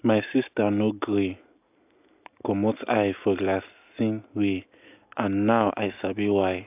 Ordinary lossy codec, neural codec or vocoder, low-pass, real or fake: AAC, 32 kbps; none; 3.6 kHz; real